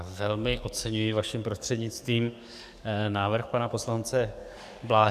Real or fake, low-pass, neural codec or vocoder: fake; 14.4 kHz; codec, 44.1 kHz, 7.8 kbps, DAC